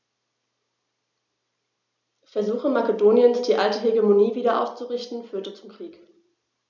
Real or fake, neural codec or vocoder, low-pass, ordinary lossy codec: real; none; 7.2 kHz; none